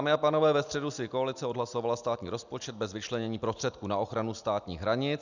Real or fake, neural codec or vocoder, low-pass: real; none; 7.2 kHz